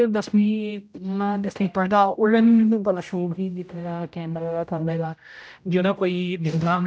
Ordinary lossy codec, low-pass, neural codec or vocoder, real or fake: none; none; codec, 16 kHz, 0.5 kbps, X-Codec, HuBERT features, trained on general audio; fake